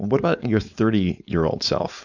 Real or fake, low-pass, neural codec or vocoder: fake; 7.2 kHz; codec, 16 kHz, 4.8 kbps, FACodec